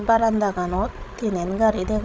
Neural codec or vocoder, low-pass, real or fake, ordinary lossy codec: codec, 16 kHz, 16 kbps, FreqCodec, larger model; none; fake; none